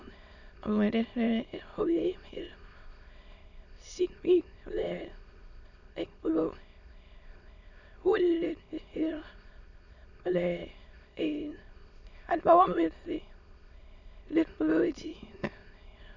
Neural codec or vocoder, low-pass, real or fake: autoencoder, 22.05 kHz, a latent of 192 numbers a frame, VITS, trained on many speakers; 7.2 kHz; fake